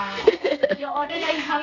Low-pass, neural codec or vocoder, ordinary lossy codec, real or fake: 7.2 kHz; codec, 32 kHz, 1.9 kbps, SNAC; none; fake